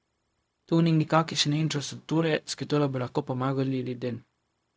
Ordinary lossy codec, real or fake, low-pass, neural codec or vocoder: none; fake; none; codec, 16 kHz, 0.4 kbps, LongCat-Audio-Codec